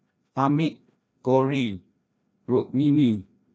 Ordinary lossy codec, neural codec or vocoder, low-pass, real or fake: none; codec, 16 kHz, 1 kbps, FreqCodec, larger model; none; fake